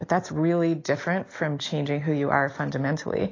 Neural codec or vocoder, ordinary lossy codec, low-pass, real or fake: none; AAC, 32 kbps; 7.2 kHz; real